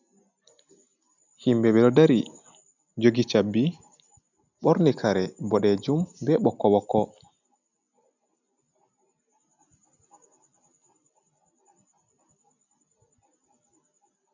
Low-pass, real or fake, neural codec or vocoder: 7.2 kHz; real; none